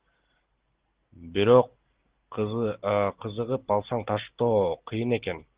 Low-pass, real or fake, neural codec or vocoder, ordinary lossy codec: 3.6 kHz; real; none; Opus, 16 kbps